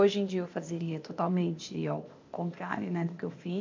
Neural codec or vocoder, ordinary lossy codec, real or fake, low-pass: codec, 16 kHz, 0.8 kbps, ZipCodec; MP3, 64 kbps; fake; 7.2 kHz